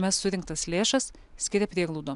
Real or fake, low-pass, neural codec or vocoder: real; 10.8 kHz; none